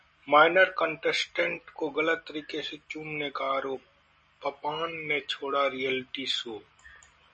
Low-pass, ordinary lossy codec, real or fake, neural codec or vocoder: 10.8 kHz; MP3, 32 kbps; real; none